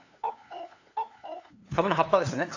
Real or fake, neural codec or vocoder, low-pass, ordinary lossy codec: fake; codec, 16 kHz, 2 kbps, FunCodec, trained on LibriTTS, 25 frames a second; 7.2 kHz; AAC, 48 kbps